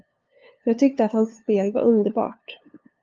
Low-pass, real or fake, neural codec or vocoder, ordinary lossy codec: 7.2 kHz; fake; codec, 16 kHz, 2 kbps, FunCodec, trained on LibriTTS, 25 frames a second; Opus, 32 kbps